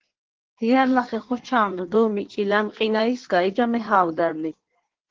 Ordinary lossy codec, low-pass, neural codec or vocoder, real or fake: Opus, 16 kbps; 7.2 kHz; codec, 16 kHz in and 24 kHz out, 1.1 kbps, FireRedTTS-2 codec; fake